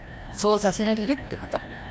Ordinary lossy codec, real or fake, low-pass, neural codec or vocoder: none; fake; none; codec, 16 kHz, 1 kbps, FreqCodec, larger model